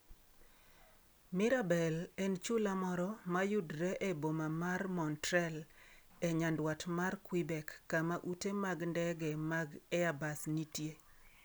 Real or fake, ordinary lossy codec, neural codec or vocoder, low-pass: real; none; none; none